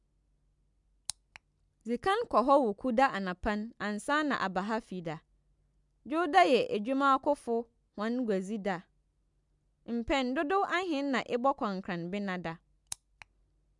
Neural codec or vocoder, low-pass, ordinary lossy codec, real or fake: none; 10.8 kHz; MP3, 96 kbps; real